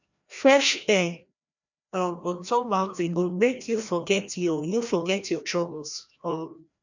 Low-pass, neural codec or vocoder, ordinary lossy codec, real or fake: 7.2 kHz; codec, 16 kHz, 1 kbps, FreqCodec, larger model; none; fake